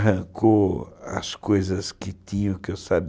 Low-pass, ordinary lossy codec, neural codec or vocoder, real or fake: none; none; none; real